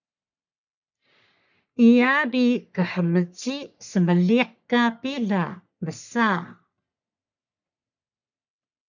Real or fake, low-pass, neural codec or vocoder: fake; 7.2 kHz; codec, 44.1 kHz, 3.4 kbps, Pupu-Codec